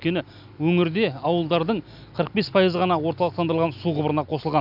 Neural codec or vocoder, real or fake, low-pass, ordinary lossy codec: none; real; 5.4 kHz; none